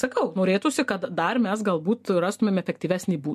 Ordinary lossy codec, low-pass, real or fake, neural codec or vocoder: MP3, 64 kbps; 14.4 kHz; real; none